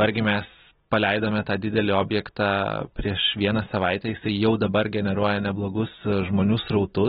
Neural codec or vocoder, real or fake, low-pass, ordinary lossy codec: none; real; 19.8 kHz; AAC, 16 kbps